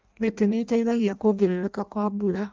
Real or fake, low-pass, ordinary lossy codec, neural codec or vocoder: fake; 7.2 kHz; Opus, 24 kbps; codec, 16 kHz in and 24 kHz out, 0.6 kbps, FireRedTTS-2 codec